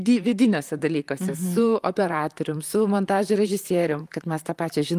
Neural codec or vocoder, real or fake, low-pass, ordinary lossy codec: vocoder, 44.1 kHz, 128 mel bands, Pupu-Vocoder; fake; 14.4 kHz; Opus, 32 kbps